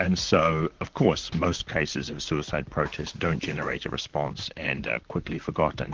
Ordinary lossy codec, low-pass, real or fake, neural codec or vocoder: Opus, 16 kbps; 7.2 kHz; fake; vocoder, 44.1 kHz, 128 mel bands, Pupu-Vocoder